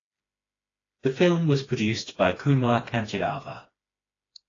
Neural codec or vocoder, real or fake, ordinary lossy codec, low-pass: codec, 16 kHz, 2 kbps, FreqCodec, smaller model; fake; AAC, 32 kbps; 7.2 kHz